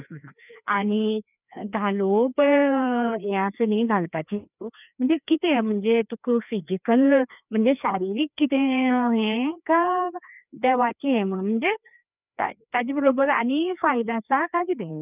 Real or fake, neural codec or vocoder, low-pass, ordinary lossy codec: fake; codec, 16 kHz, 2 kbps, FreqCodec, larger model; 3.6 kHz; none